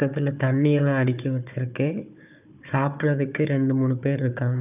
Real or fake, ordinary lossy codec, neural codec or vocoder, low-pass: fake; none; codec, 16 kHz, 4 kbps, FunCodec, trained on Chinese and English, 50 frames a second; 3.6 kHz